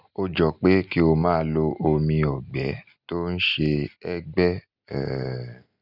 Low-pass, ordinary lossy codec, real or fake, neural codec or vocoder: 5.4 kHz; none; real; none